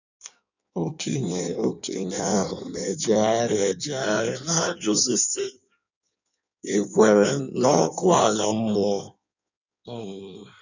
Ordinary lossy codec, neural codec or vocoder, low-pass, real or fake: none; codec, 16 kHz in and 24 kHz out, 1.1 kbps, FireRedTTS-2 codec; 7.2 kHz; fake